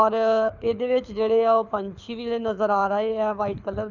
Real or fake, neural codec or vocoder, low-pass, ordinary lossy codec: fake; codec, 24 kHz, 6 kbps, HILCodec; 7.2 kHz; none